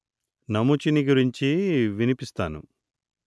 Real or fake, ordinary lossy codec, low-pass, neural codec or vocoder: real; none; none; none